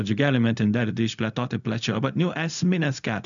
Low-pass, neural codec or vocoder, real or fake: 7.2 kHz; codec, 16 kHz, 0.4 kbps, LongCat-Audio-Codec; fake